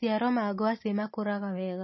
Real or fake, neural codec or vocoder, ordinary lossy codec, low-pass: real; none; MP3, 24 kbps; 7.2 kHz